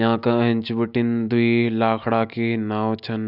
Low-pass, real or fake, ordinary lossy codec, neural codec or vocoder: 5.4 kHz; real; none; none